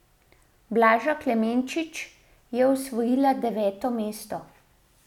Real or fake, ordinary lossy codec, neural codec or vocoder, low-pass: real; none; none; 19.8 kHz